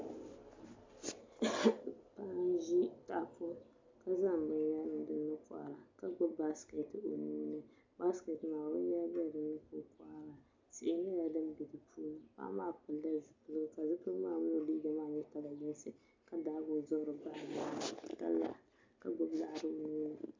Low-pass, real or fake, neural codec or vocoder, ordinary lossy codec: 7.2 kHz; real; none; MP3, 64 kbps